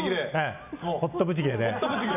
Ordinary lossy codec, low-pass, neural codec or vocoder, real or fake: none; 3.6 kHz; none; real